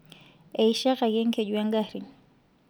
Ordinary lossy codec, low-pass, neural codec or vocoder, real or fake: none; none; none; real